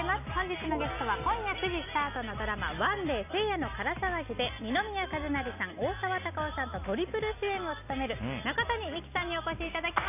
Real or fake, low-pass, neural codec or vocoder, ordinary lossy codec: real; 3.6 kHz; none; none